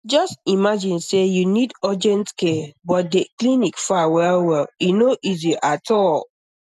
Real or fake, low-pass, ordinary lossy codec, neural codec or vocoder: real; 14.4 kHz; none; none